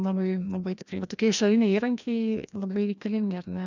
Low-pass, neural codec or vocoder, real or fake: 7.2 kHz; codec, 16 kHz, 1 kbps, FreqCodec, larger model; fake